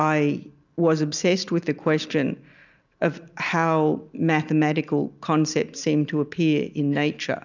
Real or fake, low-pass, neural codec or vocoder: real; 7.2 kHz; none